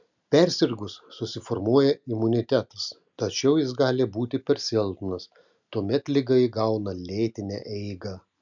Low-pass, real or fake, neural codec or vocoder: 7.2 kHz; real; none